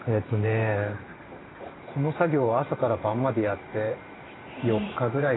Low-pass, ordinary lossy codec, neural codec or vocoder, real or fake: 7.2 kHz; AAC, 16 kbps; vocoder, 44.1 kHz, 128 mel bands every 512 samples, BigVGAN v2; fake